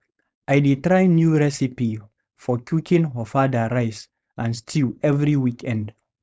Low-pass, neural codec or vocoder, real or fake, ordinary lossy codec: none; codec, 16 kHz, 4.8 kbps, FACodec; fake; none